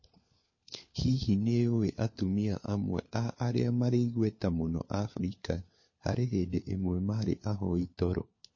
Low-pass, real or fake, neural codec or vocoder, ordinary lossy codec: 7.2 kHz; fake; codec, 16 kHz, 4 kbps, FunCodec, trained on LibriTTS, 50 frames a second; MP3, 32 kbps